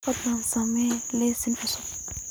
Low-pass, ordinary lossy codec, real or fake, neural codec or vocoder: none; none; real; none